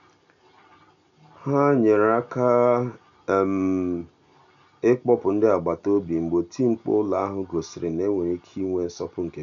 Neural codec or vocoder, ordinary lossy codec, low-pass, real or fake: none; MP3, 96 kbps; 7.2 kHz; real